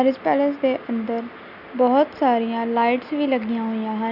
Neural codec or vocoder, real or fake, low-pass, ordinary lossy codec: none; real; 5.4 kHz; none